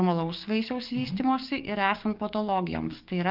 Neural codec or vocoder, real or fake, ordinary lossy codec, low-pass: codec, 44.1 kHz, 7.8 kbps, DAC; fake; Opus, 24 kbps; 5.4 kHz